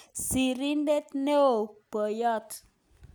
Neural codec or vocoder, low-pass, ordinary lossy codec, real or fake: none; none; none; real